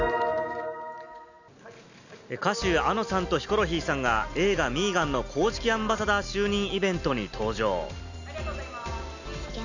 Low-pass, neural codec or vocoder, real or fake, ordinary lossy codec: 7.2 kHz; none; real; none